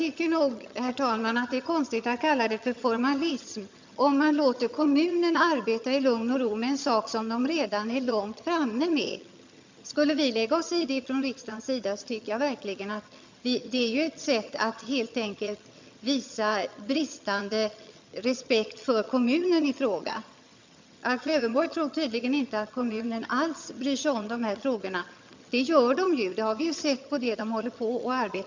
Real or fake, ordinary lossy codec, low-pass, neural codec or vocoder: fake; MP3, 64 kbps; 7.2 kHz; vocoder, 22.05 kHz, 80 mel bands, HiFi-GAN